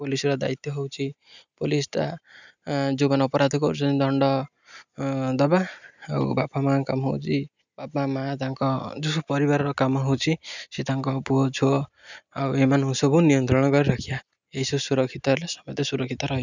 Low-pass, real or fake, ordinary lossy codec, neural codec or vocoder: 7.2 kHz; real; none; none